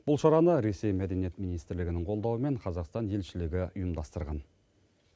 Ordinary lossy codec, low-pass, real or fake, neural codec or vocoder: none; none; real; none